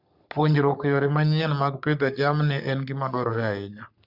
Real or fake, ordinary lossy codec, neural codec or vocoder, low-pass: fake; Opus, 64 kbps; codec, 44.1 kHz, 3.4 kbps, Pupu-Codec; 5.4 kHz